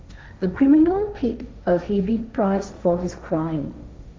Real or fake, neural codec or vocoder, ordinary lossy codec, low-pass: fake; codec, 16 kHz, 1.1 kbps, Voila-Tokenizer; none; none